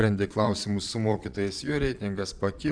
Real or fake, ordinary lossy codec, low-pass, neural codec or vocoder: fake; Opus, 64 kbps; 9.9 kHz; vocoder, 22.05 kHz, 80 mel bands, Vocos